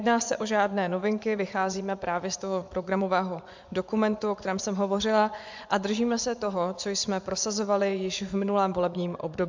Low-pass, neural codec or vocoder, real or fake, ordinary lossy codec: 7.2 kHz; vocoder, 24 kHz, 100 mel bands, Vocos; fake; MP3, 64 kbps